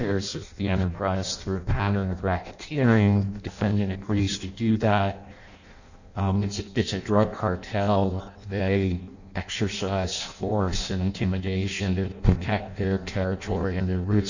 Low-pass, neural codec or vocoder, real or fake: 7.2 kHz; codec, 16 kHz in and 24 kHz out, 0.6 kbps, FireRedTTS-2 codec; fake